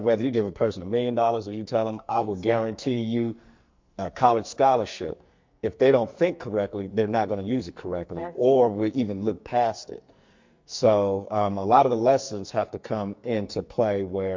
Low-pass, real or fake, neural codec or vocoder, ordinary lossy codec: 7.2 kHz; fake; codec, 44.1 kHz, 2.6 kbps, SNAC; MP3, 48 kbps